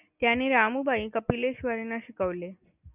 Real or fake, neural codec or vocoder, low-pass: real; none; 3.6 kHz